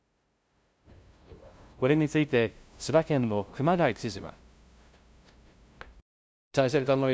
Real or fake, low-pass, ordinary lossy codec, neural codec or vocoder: fake; none; none; codec, 16 kHz, 0.5 kbps, FunCodec, trained on LibriTTS, 25 frames a second